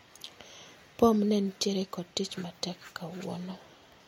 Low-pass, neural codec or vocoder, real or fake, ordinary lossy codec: 19.8 kHz; none; real; MP3, 64 kbps